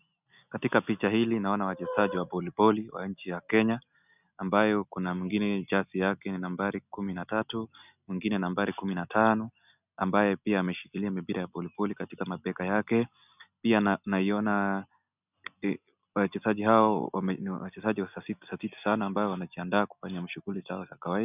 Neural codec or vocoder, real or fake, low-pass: none; real; 3.6 kHz